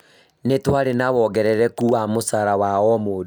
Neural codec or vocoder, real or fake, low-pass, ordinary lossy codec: none; real; none; none